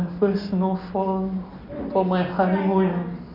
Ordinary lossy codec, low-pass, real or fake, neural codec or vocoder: none; 5.4 kHz; real; none